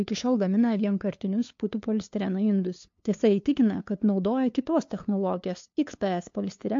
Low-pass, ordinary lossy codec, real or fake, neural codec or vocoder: 7.2 kHz; MP3, 48 kbps; fake; codec, 16 kHz, 2 kbps, FunCodec, trained on LibriTTS, 25 frames a second